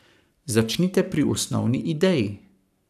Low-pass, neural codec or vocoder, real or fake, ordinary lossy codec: 14.4 kHz; codec, 44.1 kHz, 7.8 kbps, Pupu-Codec; fake; none